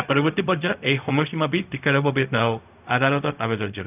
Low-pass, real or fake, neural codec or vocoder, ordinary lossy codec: 3.6 kHz; fake; codec, 16 kHz, 0.4 kbps, LongCat-Audio-Codec; none